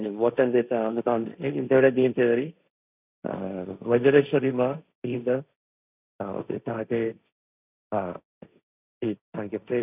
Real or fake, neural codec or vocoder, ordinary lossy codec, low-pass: fake; codec, 16 kHz, 1.1 kbps, Voila-Tokenizer; none; 3.6 kHz